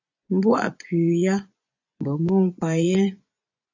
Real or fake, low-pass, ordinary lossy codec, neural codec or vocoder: fake; 7.2 kHz; AAC, 48 kbps; vocoder, 24 kHz, 100 mel bands, Vocos